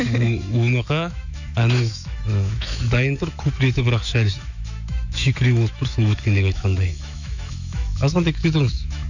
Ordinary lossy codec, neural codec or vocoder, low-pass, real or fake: none; autoencoder, 48 kHz, 128 numbers a frame, DAC-VAE, trained on Japanese speech; 7.2 kHz; fake